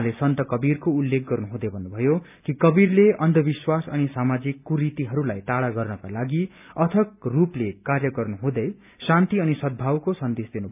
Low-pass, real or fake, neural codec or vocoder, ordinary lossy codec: 3.6 kHz; real; none; none